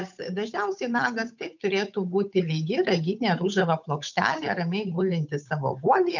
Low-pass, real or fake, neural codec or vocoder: 7.2 kHz; fake; codec, 16 kHz, 8 kbps, FunCodec, trained on Chinese and English, 25 frames a second